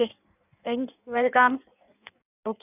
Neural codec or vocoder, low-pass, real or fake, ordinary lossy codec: codec, 16 kHz in and 24 kHz out, 1.1 kbps, FireRedTTS-2 codec; 3.6 kHz; fake; none